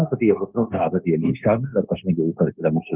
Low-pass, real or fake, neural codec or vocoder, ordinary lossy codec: 3.6 kHz; fake; codec, 16 kHz, 0.9 kbps, LongCat-Audio-Codec; Opus, 24 kbps